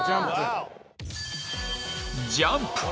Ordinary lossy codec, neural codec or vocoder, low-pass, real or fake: none; none; none; real